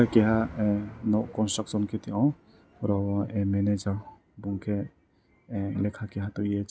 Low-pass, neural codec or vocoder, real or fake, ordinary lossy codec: none; none; real; none